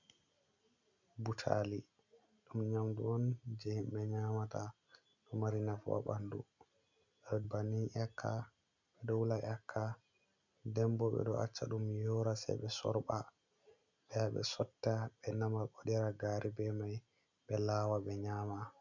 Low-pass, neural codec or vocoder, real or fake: 7.2 kHz; none; real